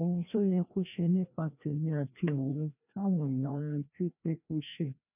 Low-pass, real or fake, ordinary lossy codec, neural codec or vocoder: 3.6 kHz; fake; none; codec, 16 kHz, 1 kbps, FreqCodec, larger model